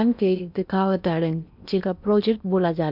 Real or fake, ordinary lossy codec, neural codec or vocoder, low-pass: fake; none; codec, 16 kHz in and 24 kHz out, 0.8 kbps, FocalCodec, streaming, 65536 codes; 5.4 kHz